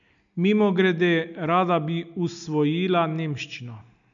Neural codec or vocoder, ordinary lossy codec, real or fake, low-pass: none; none; real; 7.2 kHz